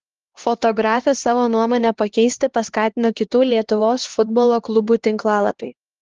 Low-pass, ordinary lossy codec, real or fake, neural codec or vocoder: 7.2 kHz; Opus, 16 kbps; fake; codec, 16 kHz, 2 kbps, X-Codec, HuBERT features, trained on LibriSpeech